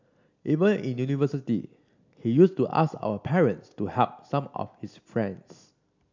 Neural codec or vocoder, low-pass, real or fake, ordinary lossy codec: none; 7.2 kHz; real; MP3, 64 kbps